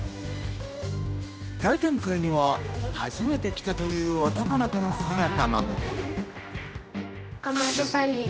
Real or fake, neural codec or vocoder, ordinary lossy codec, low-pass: fake; codec, 16 kHz, 1 kbps, X-Codec, HuBERT features, trained on general audio; none; none